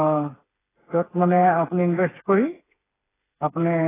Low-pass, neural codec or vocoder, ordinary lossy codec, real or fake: 3.6 kHz; codec, 16 kHz, 4 kbps, FreqCodec, smaller model; AAC, 16 kbps; fake